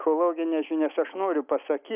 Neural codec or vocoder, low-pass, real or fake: none; 3.6 kHz; real